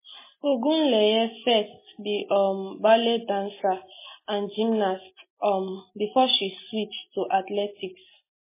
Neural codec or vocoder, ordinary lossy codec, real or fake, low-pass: none; MP3, 16 kbps; real; 3.6 kHz